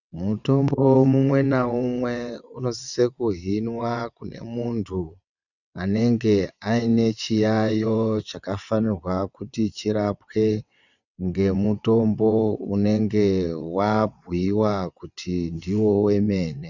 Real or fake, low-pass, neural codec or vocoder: fake; 7.2 kHz; vocoder, 22.05 kHz, 80 mel bands, WaveNeXt